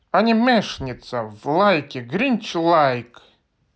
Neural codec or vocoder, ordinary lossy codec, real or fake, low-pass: none; none; real; none